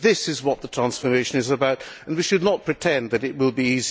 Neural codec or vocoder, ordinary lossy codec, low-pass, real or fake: none; none; none; real